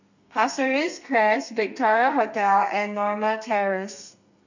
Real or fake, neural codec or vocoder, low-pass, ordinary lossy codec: fake; codec, 32 kHz, 1.9 kbps, SNAC; 7.2 kHz; none